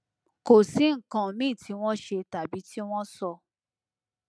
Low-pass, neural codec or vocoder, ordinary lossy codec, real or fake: none; none; none; real